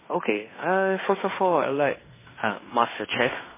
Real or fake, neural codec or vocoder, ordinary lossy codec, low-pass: fake; codec, 16 kHz in and 24 kHz out, 0.9 kbps, LongCat-Audio-Codec, fine tuned four codebook decoder; MP3, 16 kbps; 3.6 kHz